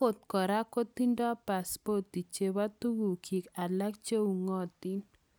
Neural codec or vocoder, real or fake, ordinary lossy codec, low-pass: none; real; none; none